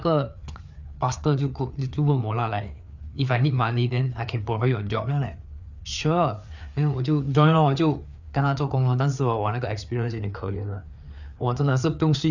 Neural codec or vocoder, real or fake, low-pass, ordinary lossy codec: codec, 16 kHz, 4 kbps, FreqCodec, larger model; fake; 7.2 kHz; none